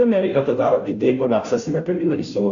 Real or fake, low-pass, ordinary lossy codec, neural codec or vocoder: fake; 7.2 kHz; MP3, 64 kbps; codec, 16 kHz, 0.5 kbps, FunCodec, trained on Chinese and English, 25 frames a second